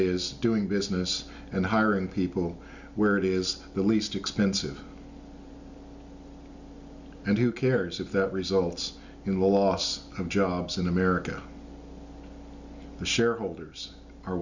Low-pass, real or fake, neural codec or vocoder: 7.2 kHz; real; none